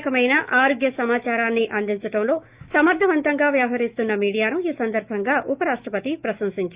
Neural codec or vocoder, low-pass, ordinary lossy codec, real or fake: autoencoder, 48 kHz, 128 numbers a frame, DAC-VAE, trained on Japanese speech; 3.6 kHz; Opus, 64 kbps; fake